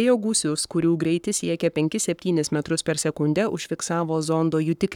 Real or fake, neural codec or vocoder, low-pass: fake; codec, 44.1 kHz, 7.8 kbps, Pupu-Codec; 19.8 kHz